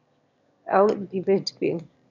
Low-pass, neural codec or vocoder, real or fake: 7.2 kHz; autoencoder, 22.05 kHz, a latent of 192 numbers a frame, VITS, trained on one speaker; fake